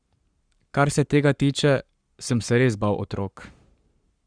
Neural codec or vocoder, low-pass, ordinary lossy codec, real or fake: codec, 44.1 kHz, 7.8 kbps, Pupu-Codec; 9.9 kHz; Opus, 64 kbps; fake